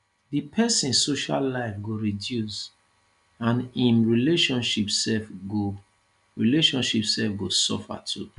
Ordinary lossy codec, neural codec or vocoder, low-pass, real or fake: none; none; 10.8 kHz; real